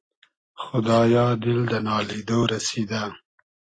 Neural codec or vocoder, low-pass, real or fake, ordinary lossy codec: none; 9.9 kHz; real; AAC, 32 kbps